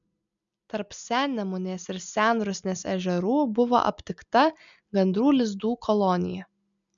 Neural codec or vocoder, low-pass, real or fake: none; 7.2 kHz; real